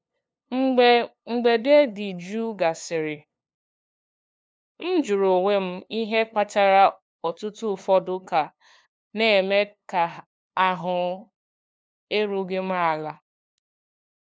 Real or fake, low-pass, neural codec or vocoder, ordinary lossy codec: fake; none; codec, 16 kHz, 2 kbps, FunCodec, trained on LibriTTS, 25 frames a second; none